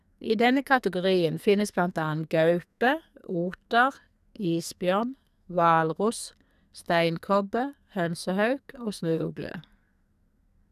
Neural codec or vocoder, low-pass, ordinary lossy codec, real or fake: codec, 44.1 kHz, 2.6 kbps, SNAC; 14.4 kHz; none; fake